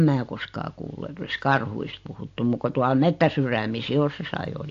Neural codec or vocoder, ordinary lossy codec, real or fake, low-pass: none; AAC, 48 kbps; real; 7.2 kHz